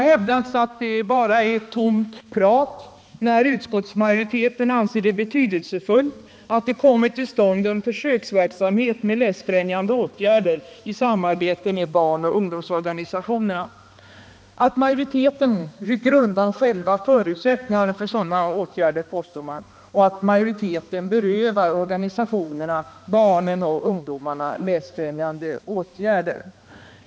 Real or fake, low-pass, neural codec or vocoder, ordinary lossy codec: fake; none; codec, 16 kHz, 2 kbps, X-Codec, HuBERT features, trained on balanced general audio; none